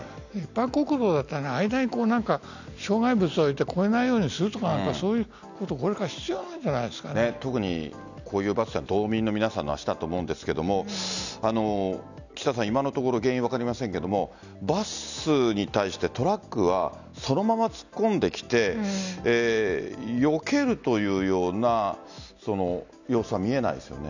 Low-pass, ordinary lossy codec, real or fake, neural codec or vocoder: 7.2 kHz; none; real; none